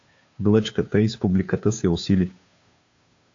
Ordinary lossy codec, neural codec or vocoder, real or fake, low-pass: AAC, 48 kbps; codec, 16 kHz, 2 kbps, FunCodec, trained on Chinese and English, 25 frames a second; fake; 7.2 kHz